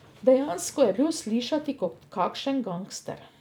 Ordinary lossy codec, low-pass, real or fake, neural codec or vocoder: none; none; fake; vocoder, 44.1 kHz, 128 mel bands every 256 samples, BigVGAN v2